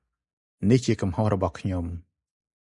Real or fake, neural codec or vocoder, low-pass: real; none; 10.8 kHz